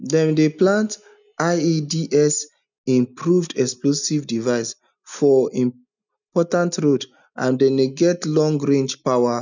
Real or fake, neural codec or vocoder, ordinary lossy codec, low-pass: real; none; none; 7.2 kHz